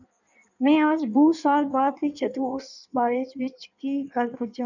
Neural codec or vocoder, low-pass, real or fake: codec, 16 kHz in and 24 kHz out, 1.1 kbps, FireRedTTS-2 codec; 7.2 kHz; fake